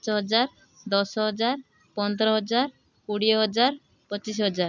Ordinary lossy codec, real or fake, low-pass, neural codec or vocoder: none; real; 7.2 kHz; none